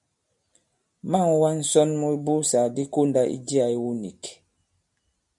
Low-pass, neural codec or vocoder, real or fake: 10.8 kHz; none; real